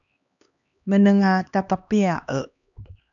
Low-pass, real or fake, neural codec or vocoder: 7.2 kHz; fake; codec, 16 kHz, 2 kbps, X-Codec, HuBERT features, trained on LibriSpeech